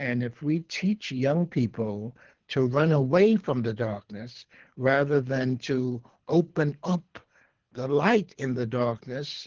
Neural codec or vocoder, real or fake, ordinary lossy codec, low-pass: codec, 24 kHz, 3 kbps, HILCodec; fake; Opus, 16 kbps; 7.2 kHz